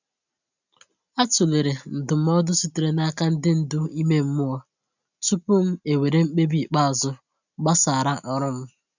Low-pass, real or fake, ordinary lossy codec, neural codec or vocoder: 7.2 kHz; real; none; none